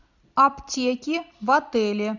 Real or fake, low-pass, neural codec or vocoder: real; 7.2 kHz; none